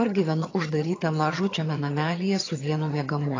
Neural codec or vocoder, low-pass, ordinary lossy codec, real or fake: vocoder, 22.05 kHz, 80 mel bands, HiFi-GAN; 7.2 kHz; AAC, 32 kbps; fake